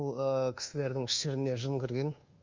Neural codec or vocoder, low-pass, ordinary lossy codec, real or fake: codec, 24 kHz, 1.2 kbps, DualCodec; 7.2 kHz; Opus, 32 kbps; fake